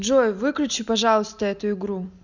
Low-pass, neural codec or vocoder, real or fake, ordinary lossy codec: 7.2 kHz; none; real; none